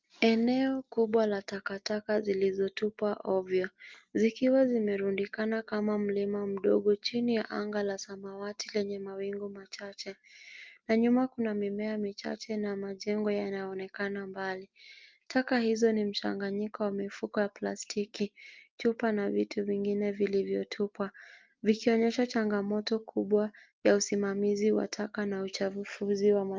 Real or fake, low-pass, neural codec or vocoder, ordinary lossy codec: real; 7.2 kHz; none; Opus, 32 kbps